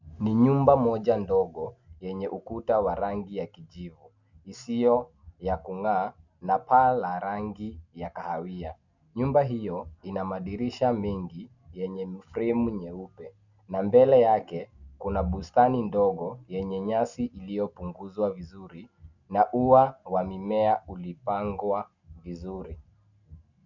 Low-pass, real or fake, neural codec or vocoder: 7.2 kHz; real; none